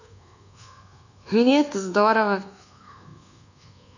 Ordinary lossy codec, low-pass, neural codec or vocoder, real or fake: none; 7.2 kHz; codec, 24 kHz, 1.2 kbps, DualCodec; fake